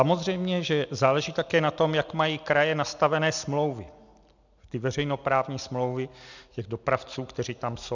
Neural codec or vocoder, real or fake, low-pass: none; real; 7.2 kHz